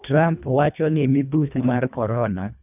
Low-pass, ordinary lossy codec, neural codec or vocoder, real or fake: 3.6 kHz; none; codec, 24 kHz, 1.5 kbps, HILCodec; fake